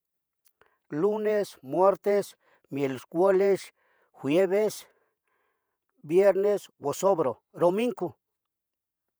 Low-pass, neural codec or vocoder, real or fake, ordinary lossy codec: none; vocoder, 48 kHz, 128 mel bands, Vocos; fake; none